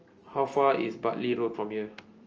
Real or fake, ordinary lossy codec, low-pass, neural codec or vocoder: real; Opus, 24 kbps; 7.2 kHz; none